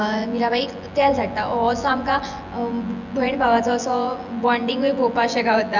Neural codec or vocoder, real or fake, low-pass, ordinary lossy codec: vocoder, 24 kHz, 100 mel bands, Vocos; fake; 7.2 kHz; none